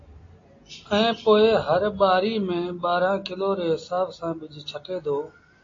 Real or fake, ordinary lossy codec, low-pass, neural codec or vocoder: real; AAC, 32 kbps; 7.2 kHz; none